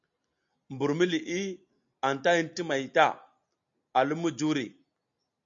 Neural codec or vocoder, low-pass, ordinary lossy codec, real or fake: none; 7.2 kHz; AAC, 64 kbps; real